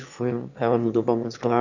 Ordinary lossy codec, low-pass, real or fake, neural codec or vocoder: none; 7.2 kHz; fake; autoencoder, 22.05 kHz, a latent of 192 numbers a frame, VITS, trained on one speaker